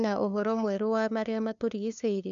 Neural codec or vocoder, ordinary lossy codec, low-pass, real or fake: codec, 16 kHz, 2 kbps, FunCodec, trained on LibriTTS, 25 frames a second; none; 7.2 kHz; fake